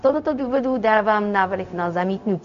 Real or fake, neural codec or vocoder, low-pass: fake; codec, 16 kHz, 0.4 kbps, LongCat-Audio-Codec; 7.2 kHz